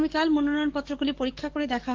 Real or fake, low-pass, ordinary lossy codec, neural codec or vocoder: fake; 7.2 kHz; Opus, 16 kbps; codec, 44.1 kHz, 7.8 kbps, DAC